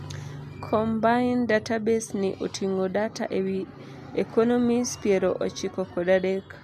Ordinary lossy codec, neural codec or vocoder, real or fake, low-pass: MP3, 96 kbps; none; real; 14.4 kHz